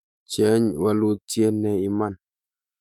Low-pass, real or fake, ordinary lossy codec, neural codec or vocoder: 19.8 kHz; fake; none; autoencoder, 48 kHz, 128 numbers a frame, DAC-VAE, trained on Japanese speech